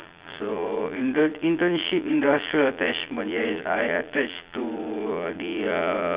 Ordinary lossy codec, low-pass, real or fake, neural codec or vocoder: Opus, 64 kbps; 3.6 kHz; fake; vocoder, 22.05 kHz, 80 mel bands, Vocos